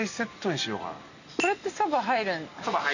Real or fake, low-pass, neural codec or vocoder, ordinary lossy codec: real; 7.2 kHz; none; none